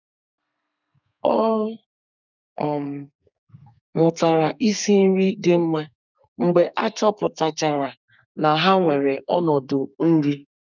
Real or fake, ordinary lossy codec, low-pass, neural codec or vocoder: fake; none; 7.2 kHz; codec, 32 kHz, 1.9 kbps, SNAC